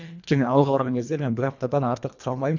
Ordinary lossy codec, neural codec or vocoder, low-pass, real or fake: none; codec, 24 kHz, 1.5 kbps, HILCodec; 7.2 kHz; fake